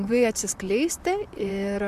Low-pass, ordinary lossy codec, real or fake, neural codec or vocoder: 14.4 kHz; MP3, 96 kbps; fake; vocoder, 44.1 kHz, 128 mel bands, Pupu-Vocoder